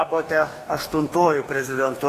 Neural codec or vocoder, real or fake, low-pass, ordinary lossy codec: codec, 44.1 kHz, 2.6 kbps, DAC; fake; 14.4 kHz; AAC, 48 kbps